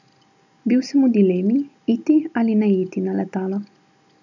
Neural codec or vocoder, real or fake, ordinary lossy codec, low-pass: none; real; none; 7.2 kHz